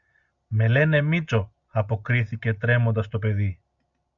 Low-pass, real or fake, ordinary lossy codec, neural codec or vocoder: 7.2 kHz; real; MP3, 64 kbps; none